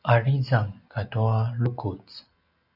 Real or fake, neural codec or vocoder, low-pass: real; none; 5.4 kHz